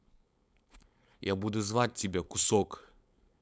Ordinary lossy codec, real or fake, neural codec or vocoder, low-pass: none; fake; codec, 16 kHz, 4.8 kbps, FACodec; none